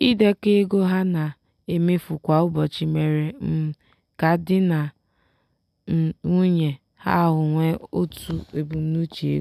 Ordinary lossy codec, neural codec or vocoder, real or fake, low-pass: none; none; real; 14.4 kHz